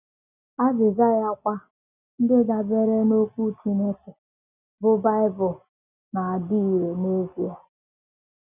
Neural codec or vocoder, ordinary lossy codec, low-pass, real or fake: none; none; 3.6 kHz; real